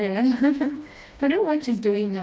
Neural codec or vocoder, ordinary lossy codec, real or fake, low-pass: codec, 16 kHz, 1 kbps, FreqCodec, smaller model; none; fake; none